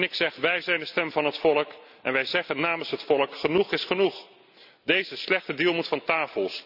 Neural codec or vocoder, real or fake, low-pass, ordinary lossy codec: none; real; 5.4 kHz; none